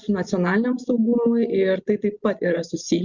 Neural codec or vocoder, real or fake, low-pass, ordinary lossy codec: none; real; 7.2 kHz; Opus, 64 kbps